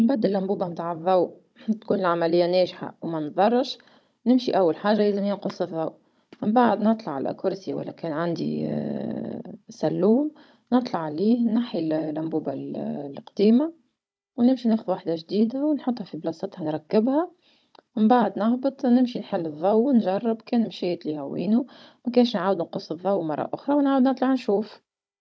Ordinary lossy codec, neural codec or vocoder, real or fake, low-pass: none; codec, 16 kHz, 16 kbps, FunCodec, trained on Chinese and English, 50 frames a second; fake; none